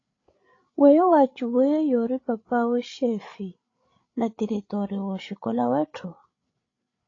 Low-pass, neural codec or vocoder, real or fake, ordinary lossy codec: 7.2 kHz; codec, 16 kHz, 8 kbps, FreqCodec, larger model; fake; AAC, 32 kbps